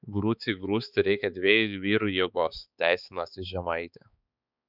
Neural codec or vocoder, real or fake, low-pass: codec, 16 kHz, 4 kbps, X-Codec, HuBERT features, trained on balanced general audio; fake; 5.4 kHz